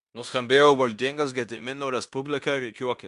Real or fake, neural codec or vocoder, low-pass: fake; codec, 16 kHz in and 24 kHz out, 0.9 kbps, LongCat-Audio-Codec, fine tuned four codebook decoder; 10.8 kHz